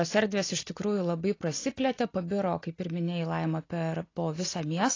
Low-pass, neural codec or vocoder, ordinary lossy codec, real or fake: 7.2 kHz; none; AAC, 32 kbps; real